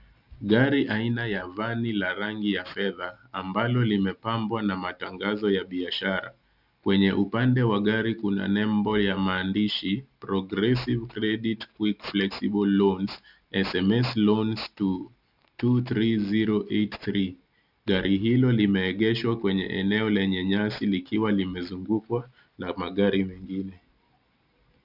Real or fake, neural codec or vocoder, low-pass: real; none; 5.4 kHz